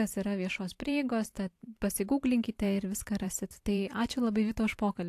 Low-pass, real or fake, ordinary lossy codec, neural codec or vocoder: 14.4 kHz; fake; AAC, 64 kbps; vocoder, 44.1 kHz, 128 mel bands every 512 samples, BigVGAN v2